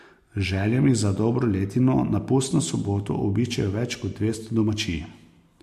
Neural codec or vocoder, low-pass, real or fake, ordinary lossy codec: none; 14.4 kHz; real; MP3, 64 kbps